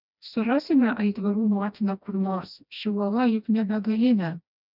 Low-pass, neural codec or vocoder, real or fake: 5.4 kHz; codec, 16 kHz, 1 kbps, FreqCodec, smaller model; fake